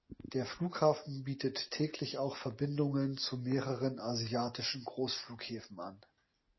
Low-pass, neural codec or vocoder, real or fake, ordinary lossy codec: 7.2 kHz; none; real; MP3, 24 kbps